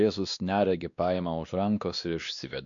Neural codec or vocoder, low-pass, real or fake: codec, 16 kHz, 2 kbps, X-Codec, WavLM features, trained on Multilingual LibriSpeech; 7.2 kHz; fake